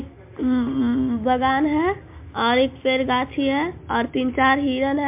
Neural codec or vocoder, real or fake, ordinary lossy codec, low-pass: none; real; MP3, 32 kbps; 3.6 kHz